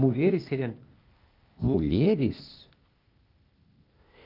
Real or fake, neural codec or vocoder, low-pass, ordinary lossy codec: fake; codec, 16 kHz, 0.8 kbps, ZipCodec; 5.4 kHz; Opus, 32 kbps